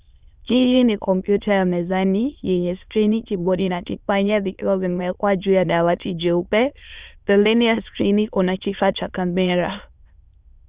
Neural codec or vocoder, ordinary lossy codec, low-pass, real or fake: autoencoder, 22.05 kHz, a latent of 192 numbers a frame, VITS, trained on many speakers; Opus, 64 kbps; 3.6 kHz; fake